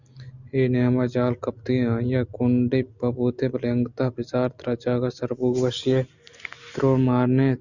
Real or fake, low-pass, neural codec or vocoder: real; 7.2 kHz; none